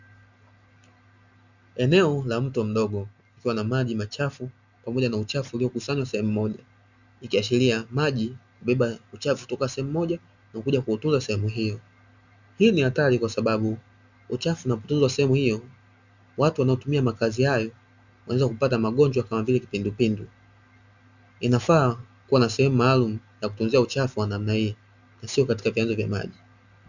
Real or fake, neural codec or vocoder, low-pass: real; none; 7.2 kHz